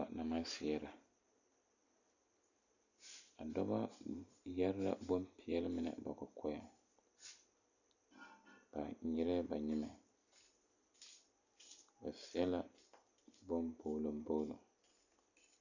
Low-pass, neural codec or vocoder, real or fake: 7.2 kHz; none; real